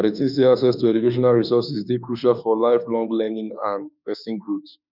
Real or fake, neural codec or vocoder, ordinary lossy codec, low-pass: fake; autoencoder, 48 kHz, 32 numbers a frame, DAC-VAE, trained on Japanese speech; none; 5.4 kHz